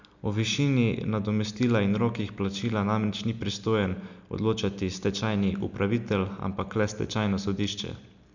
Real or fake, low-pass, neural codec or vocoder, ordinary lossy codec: real; 7.2 kHz; none; none